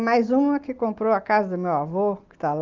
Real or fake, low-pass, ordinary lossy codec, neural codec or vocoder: real; 7.2 kHz; Opus, 32 kbps; none